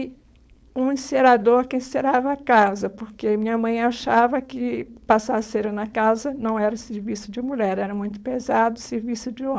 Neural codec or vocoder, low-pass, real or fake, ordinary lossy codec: codec, 16 kHz, 4.8 kbps, FACodec; none; fake; none